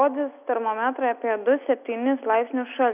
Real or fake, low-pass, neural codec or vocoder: real; 3.6 kHz; none